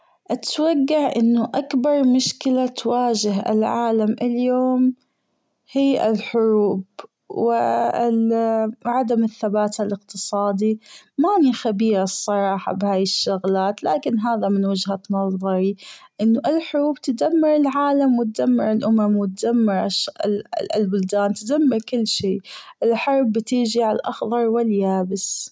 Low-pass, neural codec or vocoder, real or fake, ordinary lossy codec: none; none; real; none